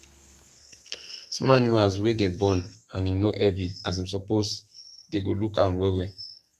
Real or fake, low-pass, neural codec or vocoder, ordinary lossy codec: fake; 14.4 kHz; codec, 32 kHz, 1.9 kbps, SNAC; Opus, 64 kbps